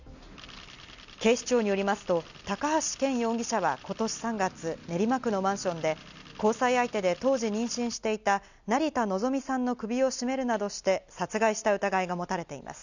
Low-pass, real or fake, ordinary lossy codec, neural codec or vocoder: 7.2 kHz; real; none; none